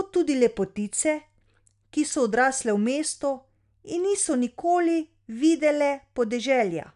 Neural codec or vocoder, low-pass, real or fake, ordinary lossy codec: none; 10.8 kHz; real; none